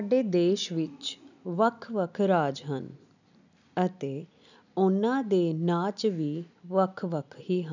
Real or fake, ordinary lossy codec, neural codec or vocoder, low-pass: real; none; none; 7.2 kHz